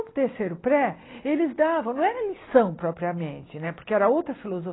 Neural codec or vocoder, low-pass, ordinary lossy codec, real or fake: none; 7.2 kHz; AAC, 16 kbps; real